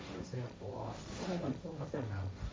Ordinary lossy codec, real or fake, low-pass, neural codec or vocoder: none; fake; none; codec, 16 kHz, 1.1 kbps, Voila-Tokenizer